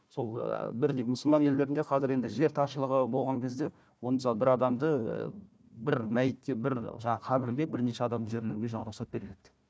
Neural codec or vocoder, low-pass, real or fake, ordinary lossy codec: codec, 16 kHz, 1 kbps, FunCodec, trained on Chinese and English, 50 frames a second; none; fake; none